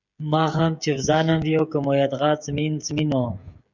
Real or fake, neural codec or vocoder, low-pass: fake; codec, 16 kHz, 16 kbps, FreqCodec, smaller model; 7.2 kHz